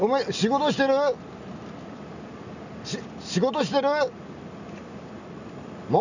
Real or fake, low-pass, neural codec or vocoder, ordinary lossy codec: real; 7.2 kHz; none; none